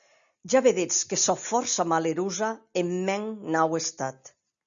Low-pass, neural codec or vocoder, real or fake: 7.2 kHz; none; real